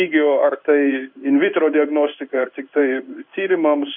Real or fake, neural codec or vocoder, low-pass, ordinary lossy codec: fake; codec, 16 kHz in and 24 kHz out, 1 kbps, XY-Tokenizer; 5.4 kHz; MP3, 48 kbps